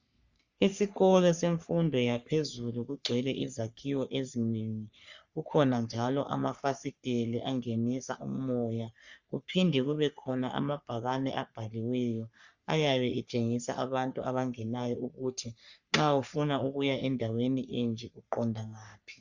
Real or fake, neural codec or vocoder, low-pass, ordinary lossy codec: fake; codec, 44.1 kHz, 3.4 kbps, Pupu-Codec; 7.2 kHz; Opus, 64 kbps